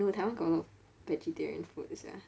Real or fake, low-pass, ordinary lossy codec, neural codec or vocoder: real; none; none; none